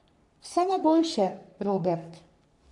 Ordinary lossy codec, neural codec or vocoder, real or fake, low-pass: none; codec, 44.1 kHz, 3.4 kbps, Pupu-Codec; fake; 10.8 kHz